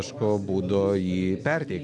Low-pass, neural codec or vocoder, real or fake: 10.8 kHz; none; real